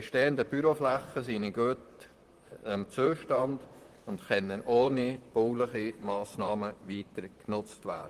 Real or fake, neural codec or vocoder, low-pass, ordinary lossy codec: fake; vocoder, 44.1 kHz, 128 mel bands, Pupu-Vocoder; 14.4 kHz; Opus, 24 kbps